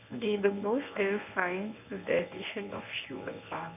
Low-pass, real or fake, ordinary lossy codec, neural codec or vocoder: 3.6 kHz; fake; none; codec, 24 kHz, 0.9 kbps, WavTokenizer, medium speech release version 1